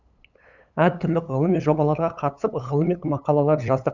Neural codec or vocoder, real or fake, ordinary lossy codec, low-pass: codec, 16 kHz, 8 kbps, FunCodec, trained on LibriTTS, 25 frames a second; fake; none; 7.2 kHz